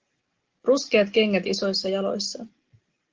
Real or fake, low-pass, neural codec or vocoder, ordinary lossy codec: real; 7.2 kHz; none; Opus, 16 kbps